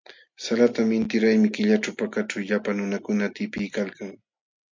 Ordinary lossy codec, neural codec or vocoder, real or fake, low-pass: MP3, 64 kbps; none; real; 7.2 kHz